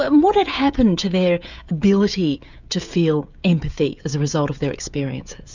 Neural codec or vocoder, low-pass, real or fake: codec, 16 kHz, 16 kbps, FreqCodec, smaller model; 7.2 kHz; fake